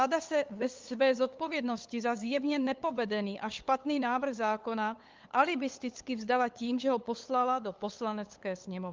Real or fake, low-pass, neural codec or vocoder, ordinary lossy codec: fake; 7.2 kHz; codec, 16 kHz, 8 kbps, FunCodec, trained on LibriTTS, 25 frames a second; Opus, 32 kbps